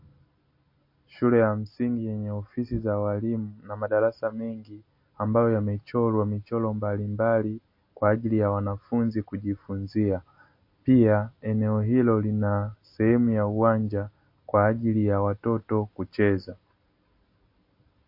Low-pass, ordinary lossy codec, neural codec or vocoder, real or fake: 5.4 kHz; MP3, 32 kbps; none; real